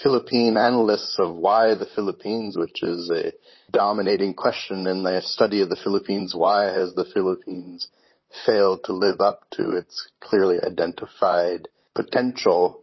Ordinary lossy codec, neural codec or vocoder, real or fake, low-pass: MP3, 24 kbps; vocoder, 44.1 kHz, 128 mel bands, Pupu-Vocoder; fake; 7.2 kHz